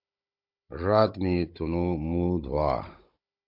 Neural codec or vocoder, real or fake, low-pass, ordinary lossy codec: codec, 16 kHz, 16 kbps, FunCodec, trained on Chinese and English, 50 frames a second; fake; 5.4 kHz; MP3, 48 kbps